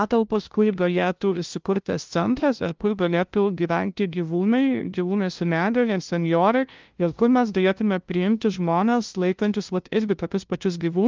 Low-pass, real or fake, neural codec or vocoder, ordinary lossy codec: 7.2 kHz; fake; codec, 16 kHz, 0.5 kbps, FunCodec, trained on LibriTTS, 25 frames a second; Opus, 32 kbps